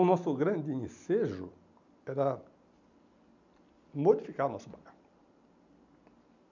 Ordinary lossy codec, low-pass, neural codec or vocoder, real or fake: none; 7.2 kHz; none; real